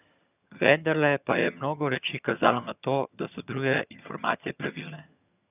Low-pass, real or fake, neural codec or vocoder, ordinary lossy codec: 3.6 kHz; fake; vocoder, 22.05 kHz, 80 mel bands, HiFi-GAN; none